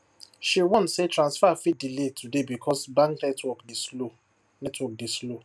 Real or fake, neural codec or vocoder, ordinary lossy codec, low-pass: real; none; none; none